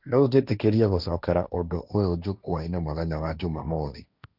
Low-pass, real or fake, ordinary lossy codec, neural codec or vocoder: 5.4 kHz; fake; none; codec, 16 kHz, 1.1 kbps, Voila-Tokenizer